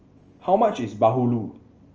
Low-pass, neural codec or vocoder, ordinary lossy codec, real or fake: 7.2 kHz; none; Opus, 24 kbps; real